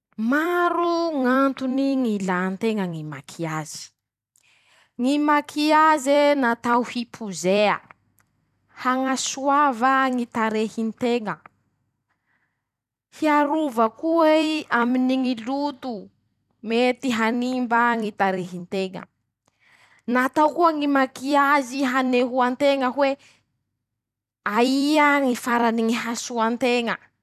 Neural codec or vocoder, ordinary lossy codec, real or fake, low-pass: vocoder, 44.1 kHz, 128 mel bands every 256 samples, BigVGAN v2; none; fake; 14.4 kHz